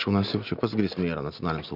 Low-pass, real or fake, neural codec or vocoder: 5.4 kHz; real; none